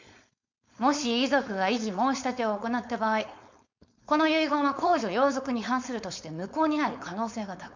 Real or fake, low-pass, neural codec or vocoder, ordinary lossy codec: fake; 7.2 kHz; codec, 16 kHz, 4.8 kbps, FACodec; MP3, 64 kbps